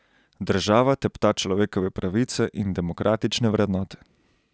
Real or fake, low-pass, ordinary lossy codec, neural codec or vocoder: real; none; none; none